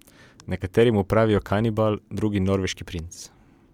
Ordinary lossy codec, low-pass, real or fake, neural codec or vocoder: MP3, 96 kbps; 19.8 kHz; real; none